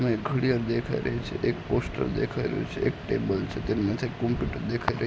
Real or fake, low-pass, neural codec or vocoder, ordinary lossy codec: real; none; none; none